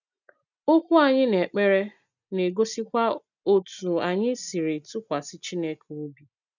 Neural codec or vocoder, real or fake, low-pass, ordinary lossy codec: none; real; 7.2 kHz; none